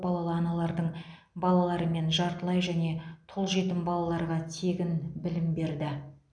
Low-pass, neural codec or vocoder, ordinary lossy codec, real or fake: 9.9 kHz; none; none; real